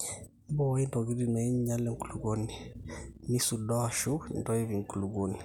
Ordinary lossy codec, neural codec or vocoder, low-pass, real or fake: none; none; 19.8 kHz; real